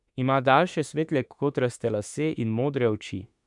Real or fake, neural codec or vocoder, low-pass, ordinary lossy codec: fake; autoencoder, 48 kHz, 32 numbers a frame, DAC-VAE, trained on Japanese speech; 10.8 kHz; none